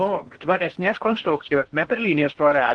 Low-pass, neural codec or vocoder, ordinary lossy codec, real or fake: 9.9 kHz; codec, 16 kHz in and 24 kHz out, 0.8 kbps, FocalCodec, streaming, 65536 codes; Opus, 16 kbps; fake